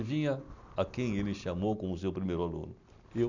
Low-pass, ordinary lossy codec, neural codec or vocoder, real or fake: 7.2 kHz; none; none; real